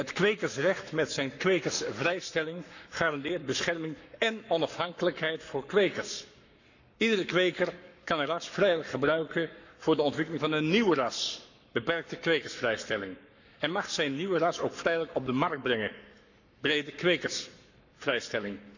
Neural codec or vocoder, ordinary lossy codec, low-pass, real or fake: codec, 44.1 kHz, 7.8 kbps, Pupu-Codec; none; 7.2 kHz; fake